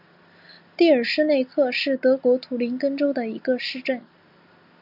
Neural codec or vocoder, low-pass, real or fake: none; 5.4 kHz; real